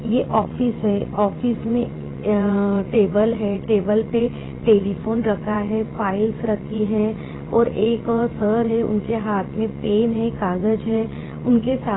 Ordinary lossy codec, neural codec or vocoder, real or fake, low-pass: AAC, 16 kbps; vocoder, 22.05 kHz, 80 mel bands, WaveNeXt; fake; 7.2 kHz